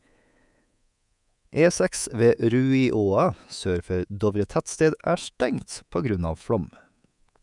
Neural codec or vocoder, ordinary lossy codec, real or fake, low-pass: codec, 24 kHz, 3.1 kbps, DualCodec; none; fake; 10.8 kHz